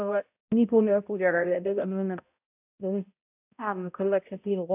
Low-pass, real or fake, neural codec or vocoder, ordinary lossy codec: 3.6 kHz; fake; codec, 16 kHz, 0.5 kbps, X-Codec, HuBERT features, trained on balanced general audio; AAC, 32 kbps